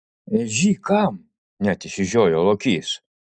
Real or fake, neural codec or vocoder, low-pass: real; none; 9.9 kHz